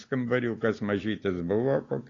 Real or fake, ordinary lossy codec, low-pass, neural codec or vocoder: real; AAC, 32 kbps; 7.2 kHz; none